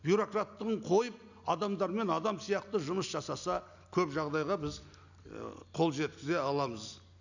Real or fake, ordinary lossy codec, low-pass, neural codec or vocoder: real; none; 7.2 kHz; none